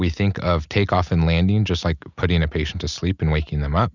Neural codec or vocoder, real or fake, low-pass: none; real; 7.2 kHz